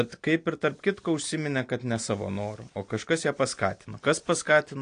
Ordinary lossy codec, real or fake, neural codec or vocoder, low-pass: AAC, 48 kbps; real; none; 9.9 kHz